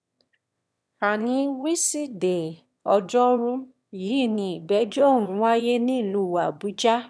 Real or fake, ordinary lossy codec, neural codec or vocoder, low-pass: fake; none; autoencoder, 22.05 kHz, a latent of 192 numbers a frame, VITS, trained on one speaker; none